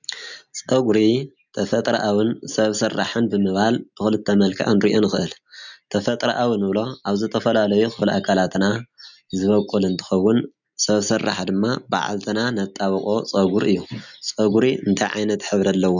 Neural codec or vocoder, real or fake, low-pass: none; real; 7.2 kHz